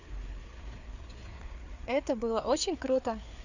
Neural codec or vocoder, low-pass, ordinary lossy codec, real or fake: codec, 16 kHz, 4 kbps, FreqCodec, larger model; 7.2 kHz; none; fake